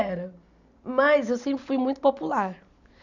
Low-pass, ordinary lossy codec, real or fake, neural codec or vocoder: 7.2 kHz; none; real; none